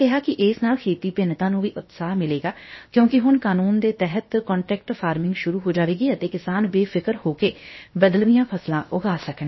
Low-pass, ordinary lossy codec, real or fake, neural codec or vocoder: 7.2 kHz; MP3, 24 kbps; fake; codec, 16 kHz, about 1 kbps, DyCAST, with the encoder's durations